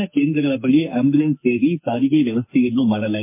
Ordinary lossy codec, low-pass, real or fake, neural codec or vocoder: MP3, 24 kbps; 3.6 kHz; fake; codec, 44.1 kHz, 2.6 kbps, SNAC